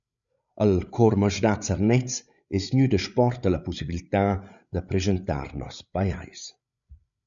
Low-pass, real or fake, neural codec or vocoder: 7.2 kHz; fake; codec, 16 kHz, 8 kbps, FreqCodec, larger model